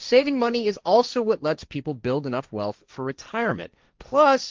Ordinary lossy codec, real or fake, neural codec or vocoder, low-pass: Opus, 32 kbps; fake; codec, 16 kHz, 1.1 kbps, Voila-Tokenizer; 7.2 kHz